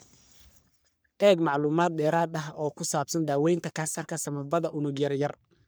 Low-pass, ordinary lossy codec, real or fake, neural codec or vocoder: none; none; fake; codec, 44.1 kHz, 3.4 kbps, Pupu-Codec